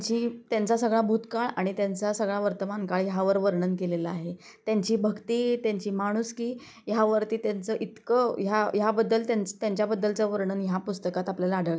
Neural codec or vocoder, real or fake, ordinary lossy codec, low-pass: none; real; none; none